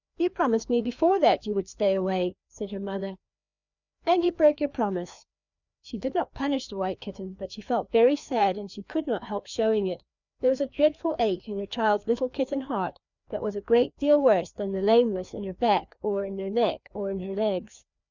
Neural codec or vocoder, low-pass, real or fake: codec, 16 kHz, 2 kbps, FreqCodec, larger model; 7.2 kHz; fake